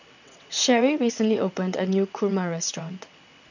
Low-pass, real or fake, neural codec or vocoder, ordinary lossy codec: 7.2 kHz; fake; vocoder, 22.05 kHz, 80 mel bands, WaveNeXt; none